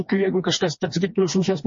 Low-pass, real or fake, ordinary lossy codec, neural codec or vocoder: 7.2 kHz; fake; MP3, 32 kbps; codec, 16 kHz, 2 kbps, FreqCodec, smaller model